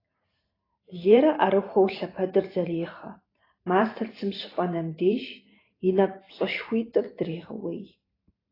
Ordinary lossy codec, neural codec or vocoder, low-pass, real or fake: AAC, 24 kbps; vocoder, 44.1 kHz, 128 mel bands every 512 samples, BigVGAN v2; 5.4 kHz; fake